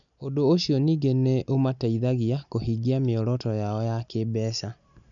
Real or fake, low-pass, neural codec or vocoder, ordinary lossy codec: real; 7.2 kHz; none; none